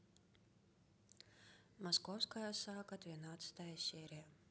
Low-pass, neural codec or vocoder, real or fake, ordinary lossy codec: none; none; real; none